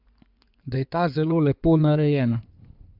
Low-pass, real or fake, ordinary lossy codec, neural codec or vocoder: 5.4 kHz; fake; AAC, 48 kbps; codec, 16 kHz in and 24 kHz out, 2.2 kbps, FireRedTTS-2 codec